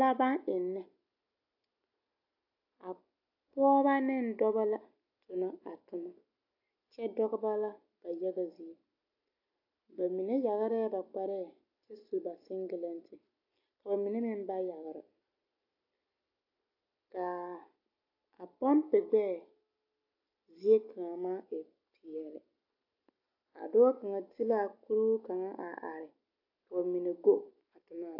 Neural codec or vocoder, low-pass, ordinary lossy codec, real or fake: none; 5.4 kHz; AAC, 32 kbps; real